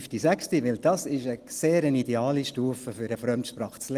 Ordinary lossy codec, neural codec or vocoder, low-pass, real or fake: Opus, 24 kbps; none; 14.4 kHz; real